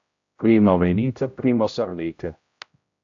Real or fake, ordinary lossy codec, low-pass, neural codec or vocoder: fake; AAC, 48 kbps; 7.2 kHz; codec, 16 kHz, 0.5 kbps, X-Codec, HuBERT features, trained on general audio